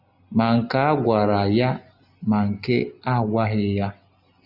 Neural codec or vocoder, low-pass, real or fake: none; 5.4 kHz; real